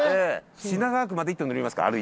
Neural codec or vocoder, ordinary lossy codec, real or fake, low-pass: none; none; real; none